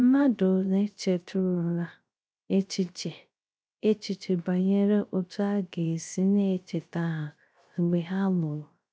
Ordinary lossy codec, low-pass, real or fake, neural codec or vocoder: none; none; fake; codec, 16 kHz, 0.3 kbps, FocalCodec